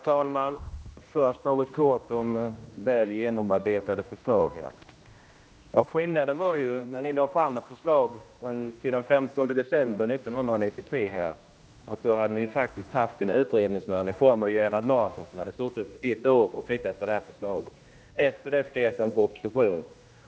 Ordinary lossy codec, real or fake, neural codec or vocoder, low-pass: none; fake; codec, 16 kHz, 1 kbps, X-Codec, HuBERT features, trained on general audio; none